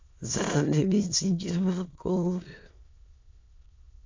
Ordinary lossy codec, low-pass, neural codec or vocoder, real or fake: MP3, 64 kbps; 7.2 kHz; autoencoder, 22.05 kHz, a latent of 192 numbers a frame, VITS, trained on many speakers; fake